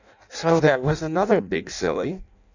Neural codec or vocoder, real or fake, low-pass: codec, 16 kHz in and 24 kHz out, 0.6 kbps, FireRedTTS-2 codec; fake; 7.2 kHz